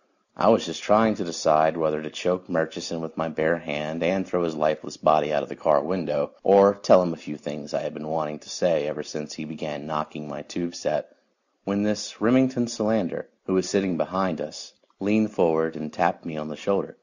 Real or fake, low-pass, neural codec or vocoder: real; 7.2 kHz; none